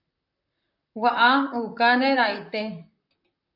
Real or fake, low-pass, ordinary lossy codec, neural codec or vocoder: fake; 5.4 kHz; AAC, 48 kbps; vocoder, 44.1 kHz, 128 mel bands, Pupu-Vocoder